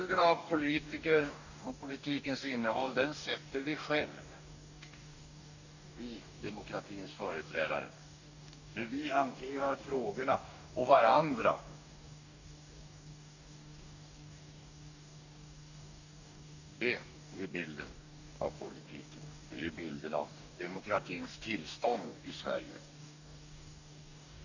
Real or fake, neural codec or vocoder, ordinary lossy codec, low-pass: fake; codec, 44.1 kHz, 2.6 kbps, DAC; none; 7.2 kHz